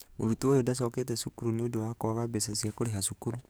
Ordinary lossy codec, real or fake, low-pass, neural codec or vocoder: none; fake; none; codec, 44.1 kHz, 7.8 kbps, DAC